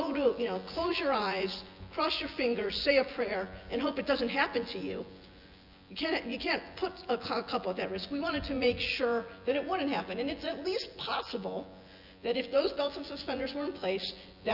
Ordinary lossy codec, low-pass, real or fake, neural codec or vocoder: Opus, 64 kbps; 5.4 kHz; fake; vocoder, 24 kHz, 100 mel bands, Vocos